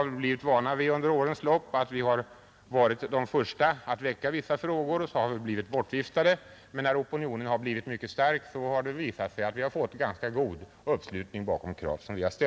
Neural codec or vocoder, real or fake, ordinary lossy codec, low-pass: none; real; none; none